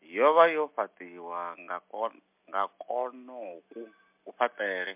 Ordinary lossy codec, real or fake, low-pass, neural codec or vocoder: MP3, 32 kbps; real; 3.6 kHz; none